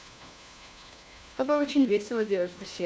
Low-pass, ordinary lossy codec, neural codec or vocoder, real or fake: none; none; codec, 16 kHz, 1 kbps, FunCodec, trained on LibriTTS, 50 frames a second; fake